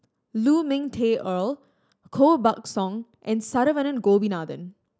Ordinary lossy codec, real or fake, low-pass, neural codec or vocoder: none; real; none; none